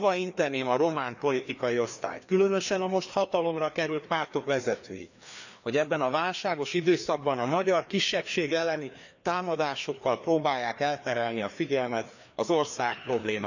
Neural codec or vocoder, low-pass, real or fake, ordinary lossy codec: codec, 16 kHz, 2 kbps, FreqCodec, larger model; 7.2 kHz; fake; none